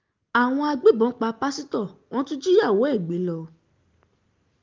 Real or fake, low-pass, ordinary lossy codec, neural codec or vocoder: real; 7.2 kHz; Opus, 32 kbps; none